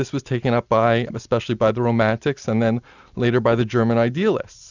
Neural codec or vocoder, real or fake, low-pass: none; real; 7.2 kHz